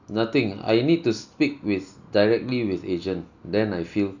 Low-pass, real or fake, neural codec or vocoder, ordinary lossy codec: 7.2 kHz; real; none; none